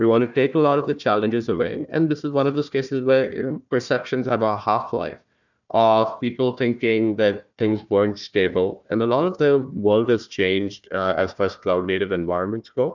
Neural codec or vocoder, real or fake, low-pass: codec, 16 kHz, 1 kbps, FunCodec, trained on Chinese and English, 50 frames a second; fake; 7.2 kHz